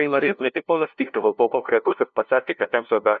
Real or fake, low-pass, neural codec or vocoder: fake; 7.2 kHz; codec, 16 kHz, 0.5 kbps, FunCodec, trained on LibriTTS, 25 frames a second